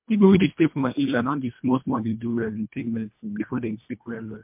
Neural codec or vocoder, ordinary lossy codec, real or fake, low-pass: codec, 24 kHz, 1.5 kbps, HILCodec; MP3, 32 kbps; fake; 3.6 kHz